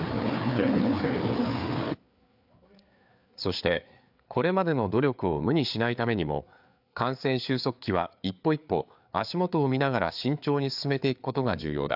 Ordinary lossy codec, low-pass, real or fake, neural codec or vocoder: AAC, 48 kbps; 5.4 kHz; fake; codec, 16 kHz, 4 kbps, FreqCodec, larger model